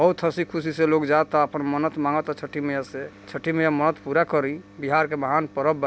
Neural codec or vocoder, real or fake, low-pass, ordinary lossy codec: none; real; none; none